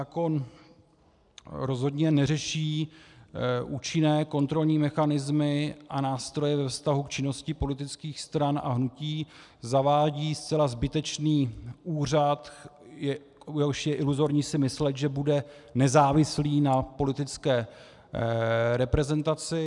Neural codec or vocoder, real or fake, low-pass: none; real; 10.8 kHz